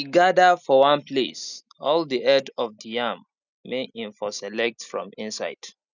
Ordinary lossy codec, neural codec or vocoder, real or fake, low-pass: none; none; real; 7.2 kHz